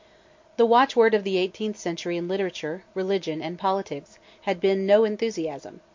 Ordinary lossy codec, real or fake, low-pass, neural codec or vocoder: MP3, 48 kbps; fake; 7.2 kHz; vocoder, 44.1 kHz, 128 mel bands every 256 samples, BigVGAN v2